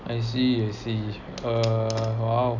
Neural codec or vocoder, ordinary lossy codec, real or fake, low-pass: none; none; real; 7.2 kHz